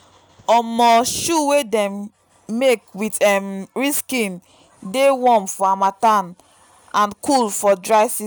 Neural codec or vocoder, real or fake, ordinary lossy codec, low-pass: none; real; none; none